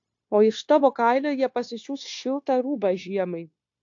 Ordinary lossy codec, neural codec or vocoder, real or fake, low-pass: AAC, 48 kbps; codec, 16 kHz, 0.9 kbps, LongCat-Audio-Codec; fake; 7.2 kHz